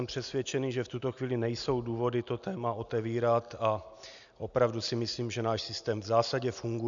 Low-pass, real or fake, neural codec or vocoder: 7.2 kHz; real; none